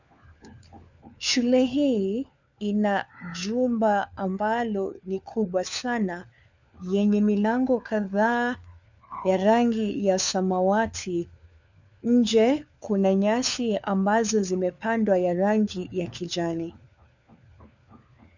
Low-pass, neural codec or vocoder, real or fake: 7.2 kHz; codec, 16 kHz, 4 kbps, FunCodec, trained on LibriTTS, 50 frames a second; fake